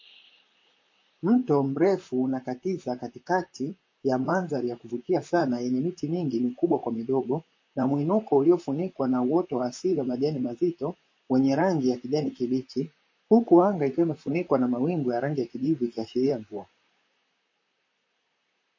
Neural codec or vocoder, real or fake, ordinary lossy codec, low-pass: vocoder, 44.1 kHz, 128 mel bands, Pupu-Vocoder; fake; MP3, 32 kbps; 7.2 kHz